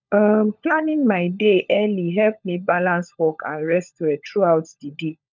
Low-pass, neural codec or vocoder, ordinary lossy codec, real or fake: 7.2 kHz; codec, 16 kHz, 16 kbps, FunCodec, trained on LibriTTS, 50 frames a second; none; fake